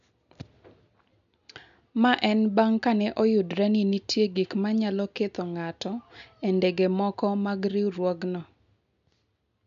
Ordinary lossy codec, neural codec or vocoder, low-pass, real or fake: none; none; 7.2 kHz; real